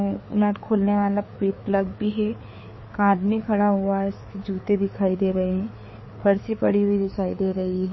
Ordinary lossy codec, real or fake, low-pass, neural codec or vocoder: MP3, 24 kbps; fake; 7.2 kHz; codec, 16 kHz, 4 kbps, FreqCodec, larger model